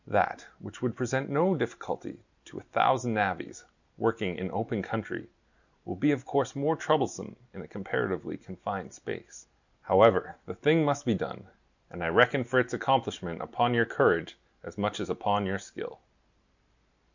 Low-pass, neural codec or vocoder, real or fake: 7.2 kHz; none; real